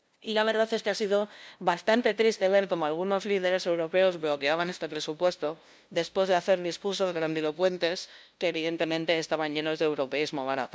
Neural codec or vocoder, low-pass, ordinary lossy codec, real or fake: codec, 16 kHz, 0.5 kbps, FunCodec, trained on LibriTTS, 25 frames a second; none; none; fake